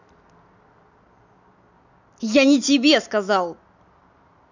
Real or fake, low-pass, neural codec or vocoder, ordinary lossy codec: real; 7.2 kHz; none; none